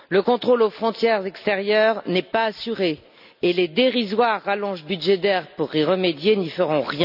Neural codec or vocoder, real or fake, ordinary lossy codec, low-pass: none; real; none; 5.4 kHz